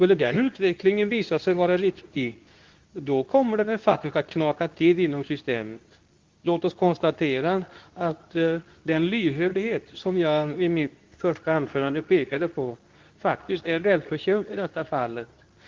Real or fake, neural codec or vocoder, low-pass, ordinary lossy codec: fake; codec, 24 kHz, 0.9 kbps, WavTokenizer, medium speech release version 2; 7.2 kHz; Opus, 32 kbps